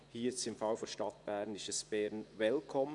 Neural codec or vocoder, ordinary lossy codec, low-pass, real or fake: vocoder, 24 kHz, 100 mel bands, Vocos; none; 10.8 kHz; fake